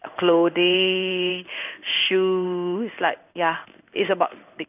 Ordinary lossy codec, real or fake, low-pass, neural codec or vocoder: none; fake; 3.6 kHz; codec, 16 kHz in and 24 kHz out, 1 kbps, XY-Tokenizer